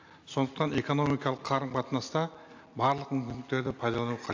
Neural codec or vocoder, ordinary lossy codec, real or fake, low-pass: none; AAC, 48 kbps; real; 7.2 kHz